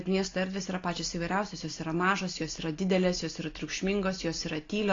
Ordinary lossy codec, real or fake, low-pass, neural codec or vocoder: AAC, 32 kbps; real; 7.2 kHz; none